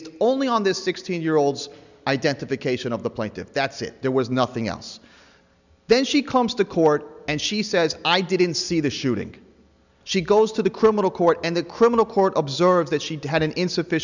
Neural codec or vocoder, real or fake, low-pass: none; real; 7.2 kHz